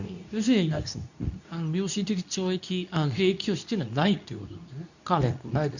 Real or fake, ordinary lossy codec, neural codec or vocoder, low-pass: fake; none; codec, 24 kHz, 0.9 kbps, WavTokenizer, medium speech release version 2; 7.2 kHz